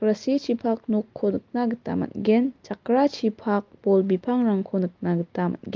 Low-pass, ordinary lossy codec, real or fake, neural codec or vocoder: 7.2 kHz; Opus, 32 kbps; real; none